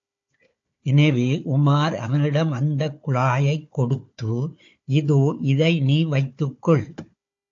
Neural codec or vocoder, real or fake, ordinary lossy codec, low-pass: codec, 16 kHz, 4 kbps, FunCodec, trained on Chinese and English, 50 frames a second; fake; AAC, 48 kbps; 7.2 kHz